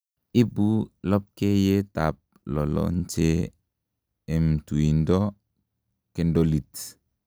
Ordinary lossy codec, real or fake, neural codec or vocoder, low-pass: none; real; none; none